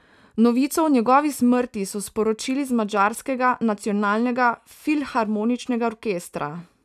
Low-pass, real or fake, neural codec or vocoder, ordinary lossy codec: 14.4 kHz; real; none; none